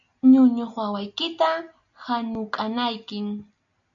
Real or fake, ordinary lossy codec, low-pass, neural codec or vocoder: real; MP3, 96 kbps; 7.2 kHz; none